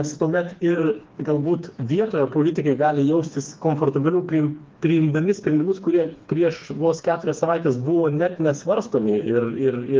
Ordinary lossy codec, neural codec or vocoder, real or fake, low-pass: Opus, 24 kbps; codec, 16 kHz, 2 kbps, FreqCodec, smaller model; fake; 7.2 kHz